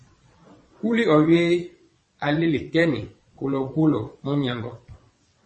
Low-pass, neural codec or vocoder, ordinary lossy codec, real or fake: 9.9 kHz; vocoder, 22.05 kHz, 80 mel bands, WaveNeXt; MP3, 32 kbps; fake